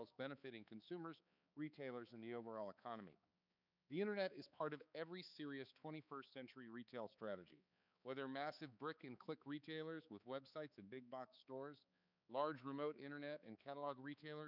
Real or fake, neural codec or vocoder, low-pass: fake; codec, 16 kHz, 4 kbps, X-Codec, HuBERT features, trained on balanced general audio; 5.4 kHz